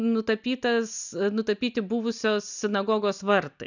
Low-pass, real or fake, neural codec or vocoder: 7.2 kHz; real; none